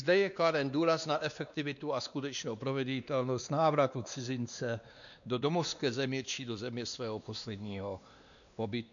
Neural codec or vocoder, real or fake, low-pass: codec, 16 kHz, 2 kbps, X-Codec, WavLM features, trained on Multilingual LibriSpeech; fake; 7.2 kHz